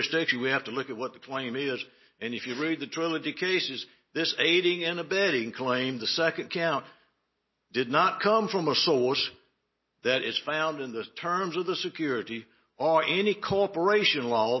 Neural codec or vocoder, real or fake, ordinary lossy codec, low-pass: none; real; MP3, 24 kbps; 7.2 kHz